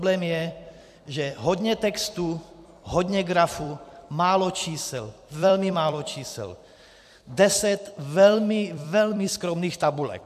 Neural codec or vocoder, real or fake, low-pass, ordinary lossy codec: vocoder, 44.1 kHz, 128 mel bands every 256 samples, BigVGAN v2; fake; 14.4 kHz; AAC, 96 kbps